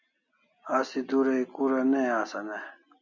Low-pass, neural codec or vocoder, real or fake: 7.2 kHz; none; real